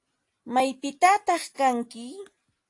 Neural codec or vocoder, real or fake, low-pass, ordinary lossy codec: none; real; 10.8 kHz; AAC, 48 kbps